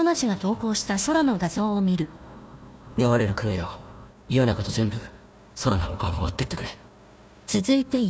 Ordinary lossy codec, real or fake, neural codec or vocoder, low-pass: none; fake; codec, 16 kHz, 1 kbps, FunCodec, trained on Chinese and English, 50 frames a second; none